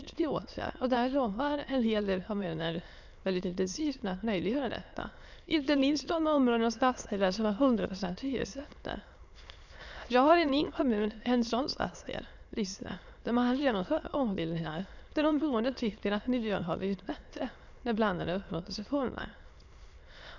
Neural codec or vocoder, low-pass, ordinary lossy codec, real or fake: autoencoder, 22.05 kHz, a latent of 192 numbers a frame, VITS, trained on many speakers; 7.2 kHz; none; fake